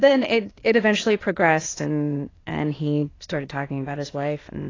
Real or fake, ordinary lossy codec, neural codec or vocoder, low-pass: fake; AAC, 32 kbps; codec, 16 kHz, 0.8 kbps, ZipCodec; 7.2 kHz